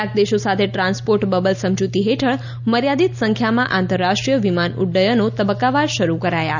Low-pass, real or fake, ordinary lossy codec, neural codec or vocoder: 7.2 kHz; real; none; none